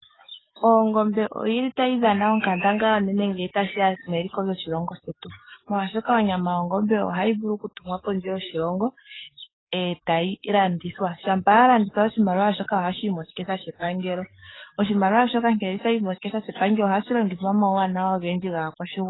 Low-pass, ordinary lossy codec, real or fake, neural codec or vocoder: 7.2 kHz; AAC, 16 kbps; fake; codec, 44.1 kHz, 7.8 kbps, DAC